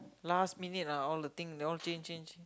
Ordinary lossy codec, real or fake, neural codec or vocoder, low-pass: none; real; none; none